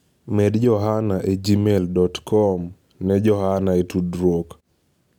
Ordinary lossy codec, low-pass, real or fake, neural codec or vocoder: none; 19.8 kHz; real; none